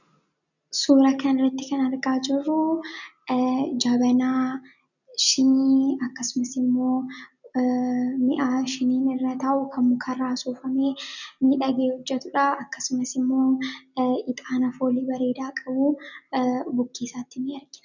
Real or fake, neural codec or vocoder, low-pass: real; none; 7.2 kHz